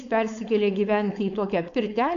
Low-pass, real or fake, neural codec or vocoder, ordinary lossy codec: 7.2 kHz; fake; codec, 16 kHz, 4.8 kbps, FACodec; MP3, 64 kbps